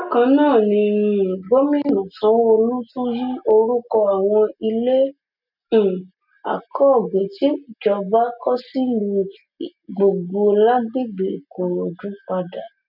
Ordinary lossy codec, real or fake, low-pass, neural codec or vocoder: none; real; 5.4 kHz; none